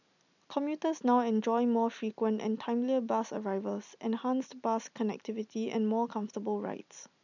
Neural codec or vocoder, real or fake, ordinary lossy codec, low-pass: none; real; none; 7.2 kHz